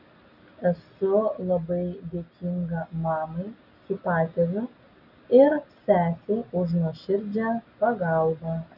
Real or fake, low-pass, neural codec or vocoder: real; 5.4 kHz; none